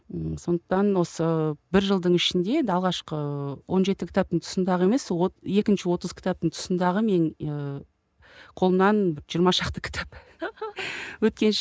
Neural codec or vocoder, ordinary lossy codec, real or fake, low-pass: none; none; real; none